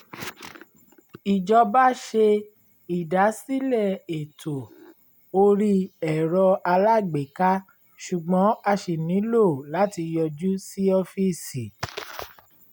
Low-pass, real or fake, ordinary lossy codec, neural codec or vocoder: 19.8 kHz; real; none; none